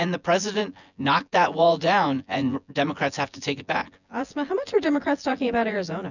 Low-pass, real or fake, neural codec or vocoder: 7.2 kHz; fake; vocoder, 24 kHz, 100 mel bands, Vocos